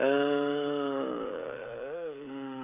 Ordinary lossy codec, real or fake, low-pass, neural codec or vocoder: none; fake; 3.6 kHz; codec, 16 kHz, 16 kbps, FreqCodec, smaller model